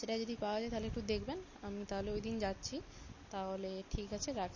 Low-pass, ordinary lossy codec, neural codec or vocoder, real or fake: 7.2 kHz; MP3, 32 kbps; none; real